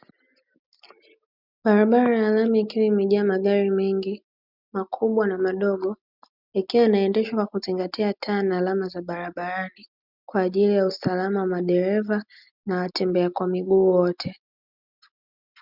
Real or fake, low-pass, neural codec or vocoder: real; 5.4 kHz; none